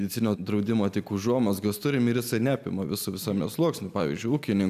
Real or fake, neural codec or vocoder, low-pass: real; none; 14.4 kHz